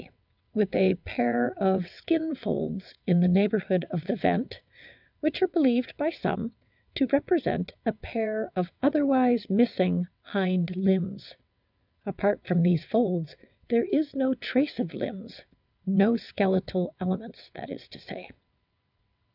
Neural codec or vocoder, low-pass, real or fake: vocoder, 22.05 kHz, 80 mel bands, WaveNeXt; 5.4 kHz; fake